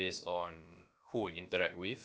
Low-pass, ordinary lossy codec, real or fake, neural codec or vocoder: none; none; fake; codec, 16 kHz, about 1 kbps, DyCAST, with the encoder's durations